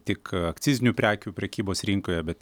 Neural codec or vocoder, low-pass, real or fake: none; 19.8 kHz; real